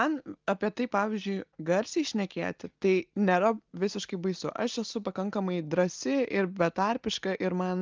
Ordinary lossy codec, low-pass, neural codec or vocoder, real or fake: Opus, 24 kbps; 7.2 kHz; none; real